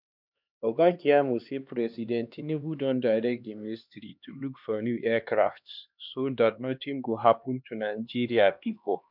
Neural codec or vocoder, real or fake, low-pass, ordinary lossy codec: codec, 16 kHz, 2 kbps, X-Codec, HuBERT features, trained on LibriSpeech; fake; 5.4 kHz; none